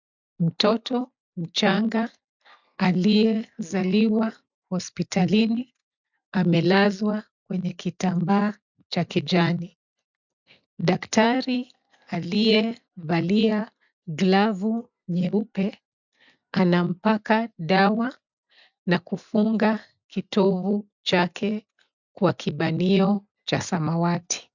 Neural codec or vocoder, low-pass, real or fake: none; 7.2 kHz; real